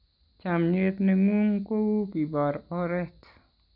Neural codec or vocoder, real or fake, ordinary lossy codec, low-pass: none; real; none; 5.4 kHz